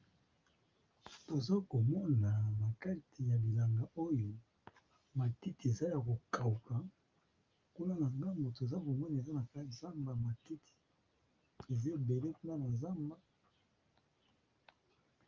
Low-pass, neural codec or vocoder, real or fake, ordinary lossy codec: 7.2 kHz; none; real; Opus, 24 kbps